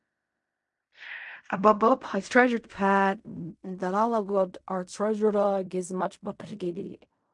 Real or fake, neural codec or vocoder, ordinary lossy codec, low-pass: fake; codec, 16 kHz in and 24 kHz out, 0.4 kbps, LongCat-Audio-Codec, fine tuned four codebook decoder; MP3, 64 kbps; 10.8 kHz